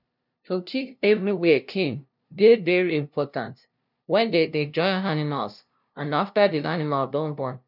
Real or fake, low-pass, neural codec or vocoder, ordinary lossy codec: fake; 5.4 kHz; codec, 16 kHz, 0.5 kbps, FunCodec, trained on LibriTTS, 25 frames a second; none